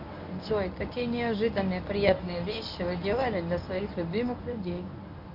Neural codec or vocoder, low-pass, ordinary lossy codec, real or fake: codec, 24 kHz, 0.9 kbps, WavTokenizer, medium speech release version 1; 5.4 kHz; AAC, 48 kbps; fake